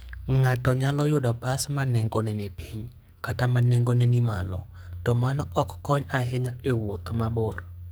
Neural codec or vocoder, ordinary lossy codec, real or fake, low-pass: codec, 44.1 kHz, 2.6 kbps, SNAC; none; fake; none